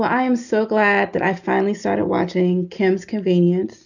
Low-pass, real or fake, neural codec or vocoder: 7.2 kHz; real; none